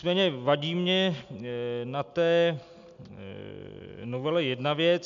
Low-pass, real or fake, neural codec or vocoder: 7.2 kHz; real; none